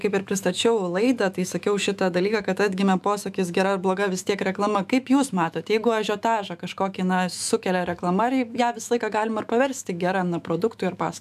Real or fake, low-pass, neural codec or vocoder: fake; 14.4 kHz; autoencoder, 48 kHz, 128 numbers a frame, DAC-VAE, trained on Japanese speech